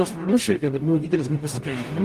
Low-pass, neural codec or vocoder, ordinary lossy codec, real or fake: 14.4 kHz; codec, 44.1 kHz, 0.9 kbps, DAC; Opus, 24 kbps; fake